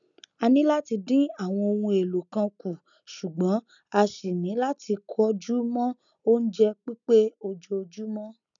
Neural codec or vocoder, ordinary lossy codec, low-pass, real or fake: none; none; 7.2 kHz; real